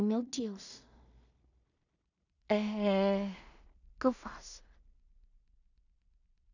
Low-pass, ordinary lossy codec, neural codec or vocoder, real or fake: 7.2 kHz; none; codec, 16 kHz in and 24 kHz out, 0.4 kbps, LongCat-Audio-Codec, two codebook decoder; fake